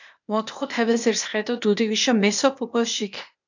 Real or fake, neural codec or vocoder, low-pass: fake; codec, 16 kHz, 0.8 kbps, ZipCodec; 7.2 kHz